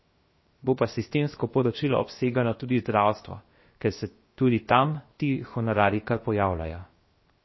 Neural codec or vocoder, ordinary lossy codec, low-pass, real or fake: codec, 16 kHz, 0.7 kbps, FocalCodec; MP3, 24 kbps; 7.2 kHz; fake